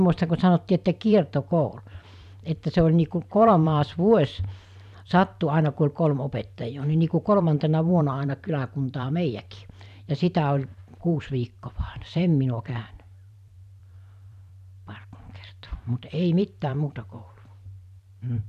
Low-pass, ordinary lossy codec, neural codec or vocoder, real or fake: 14.4 kHz; none; vocoder, 44.1 kHz, 128 mel bands every 512 samples, BigVGAN v2; fake